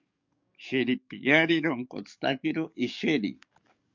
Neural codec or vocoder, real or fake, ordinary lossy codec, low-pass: codec, 44.1 kHz, 7.8 kbps, DAC; fake; MP3, 64 kbps; 7.2 kHz